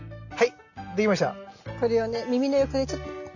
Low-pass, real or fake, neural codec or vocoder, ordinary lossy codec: 7.2 kHz; real; none; none